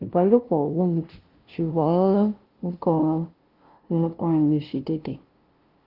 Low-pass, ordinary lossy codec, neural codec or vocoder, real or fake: 5.4 kHz; Opus, 16 kbps; codec, 16 kHz, 0.5 kbps, FunCodec, trained on LibriTTS, 25 frames a second; fake